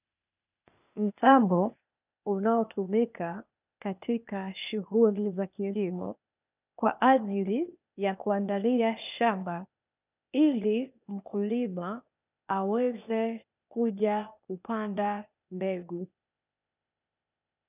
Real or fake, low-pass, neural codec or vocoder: fake; 3.6 kHz; codec, 16 kHz, 0.8 kbps, ZipCodec